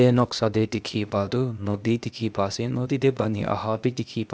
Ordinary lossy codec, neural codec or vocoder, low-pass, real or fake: none; codec, 16 kHz, 0.8 kbps, ZipCodec; none; fake